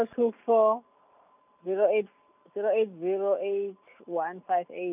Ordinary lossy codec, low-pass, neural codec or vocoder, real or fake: none; 3.6 kHz; none; real